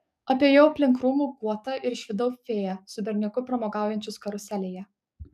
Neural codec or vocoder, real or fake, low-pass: codec, 44.1 kHz, 7.8 kbps, DAC; fake; 14.4 kHz